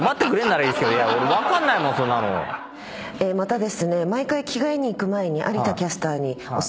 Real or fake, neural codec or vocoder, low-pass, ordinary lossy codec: real; none; none; none